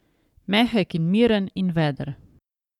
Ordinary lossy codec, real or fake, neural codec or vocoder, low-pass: none; fake; codec, 44.1 kHz, 7.8 kbps, Pupu-Codec; 19.8 kHz